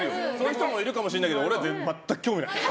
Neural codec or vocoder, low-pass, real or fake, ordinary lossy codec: none; none; real; none